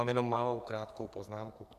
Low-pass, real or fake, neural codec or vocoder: 14.4 kHz; fake; codec, 44.1 kHz, 2.6 kbps, SNAC